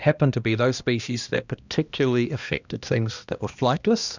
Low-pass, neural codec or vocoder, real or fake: 7.2 kHz; codec, 16 kHz, 1 kbps, X-Codec, HuBERT features, trained on balanced general audio; fake